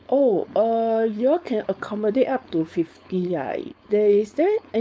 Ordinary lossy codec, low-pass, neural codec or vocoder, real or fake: none; none; codec, 16 kHz, 4.8 kbps, FACodec; fake